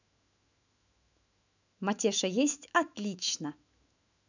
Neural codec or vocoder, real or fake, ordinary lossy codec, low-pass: autoencoder, 48 kHz, 128 numbers a frame, DAC-VAE, trained on Japanese speech; fake; none; 7.2 kHz